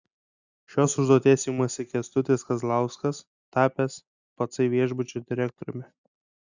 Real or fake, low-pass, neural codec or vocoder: real; 7.2 kHz; none